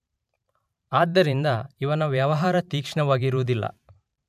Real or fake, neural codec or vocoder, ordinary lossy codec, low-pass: fake; vocoder, 44.1 kHz, 128 mel bands every 512 samples, BigVGAN v2; none; 14.4 kHz